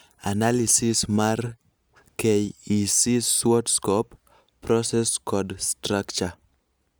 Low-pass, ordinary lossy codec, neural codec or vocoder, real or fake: none; none; none; real